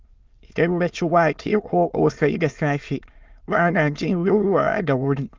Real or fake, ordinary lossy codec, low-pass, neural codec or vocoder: fake; Opus, 32 kbps; 7.2 kHz; autoencoder, 22.05 kHz, a latent of 192 numbers a frame, VITS, trained on many speakers